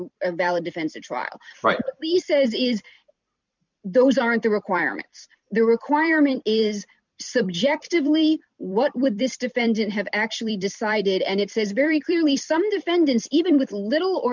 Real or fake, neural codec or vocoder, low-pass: fake; vocoder, 44.1 kHz, 128 mel bands every 256 samples, BigVGAN v2; 7.2 kHz